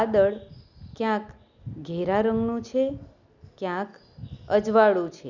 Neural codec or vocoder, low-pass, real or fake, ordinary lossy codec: none; 7.2 kHz; real; none